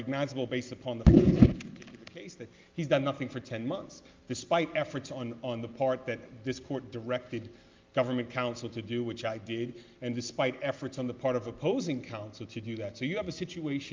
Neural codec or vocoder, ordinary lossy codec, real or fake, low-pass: none; Opus, 24 kbps; real; 7.2 kHz